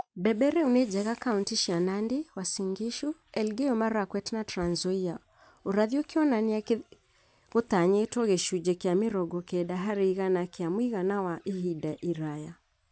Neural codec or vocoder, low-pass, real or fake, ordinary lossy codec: none; none; real; none